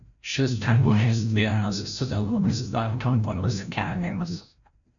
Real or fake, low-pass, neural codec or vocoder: fake; 7.2 kHz; codec, 16 kHz, 0.5 kbps, FreqCodec, larger model